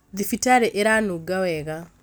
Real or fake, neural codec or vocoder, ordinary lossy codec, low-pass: real; none; none; none